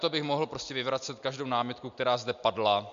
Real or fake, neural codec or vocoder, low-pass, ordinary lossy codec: real; none; 7.2 kHz; MP3, 64 kbps